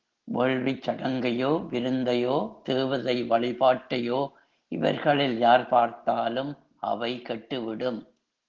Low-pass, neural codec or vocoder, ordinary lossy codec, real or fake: 7.2 kHz; none; Opus, 16 kbps; real